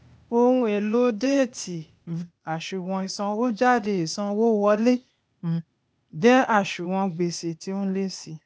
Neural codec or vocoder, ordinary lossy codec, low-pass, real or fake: codec, 16 kHz, 0.8 kbps, ZipCodec; none; none; fake